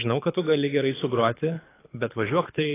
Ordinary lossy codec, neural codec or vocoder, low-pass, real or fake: AAC, 16 kbps; codec, 24 kHz, 6 kbps, HILCodec; 3.6 kHz; fake